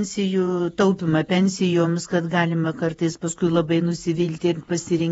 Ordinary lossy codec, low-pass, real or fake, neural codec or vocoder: AAC, 24 kbps; 19.8 kHz; fake; vocoder, 48 kHz, 128 mel bands, Vocos